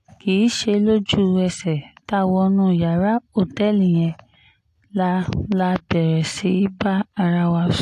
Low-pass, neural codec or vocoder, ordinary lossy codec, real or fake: 14.4 kHz; none; AAC, 64 kbps; real